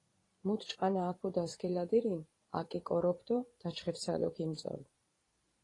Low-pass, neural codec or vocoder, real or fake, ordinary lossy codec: 10.8 kHz; vocoder, 44.1 kHz, 128 mel bands every 512 samples, BigVGAN v2; fake; AAC, 32 kbps